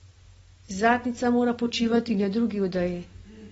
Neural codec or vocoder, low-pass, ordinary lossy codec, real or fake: none; 19.8 kHz; AAC, 24 kbps; real